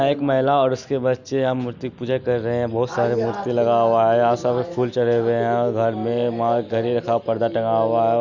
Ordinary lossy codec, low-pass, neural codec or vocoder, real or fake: none; 7.2 kHz; none; real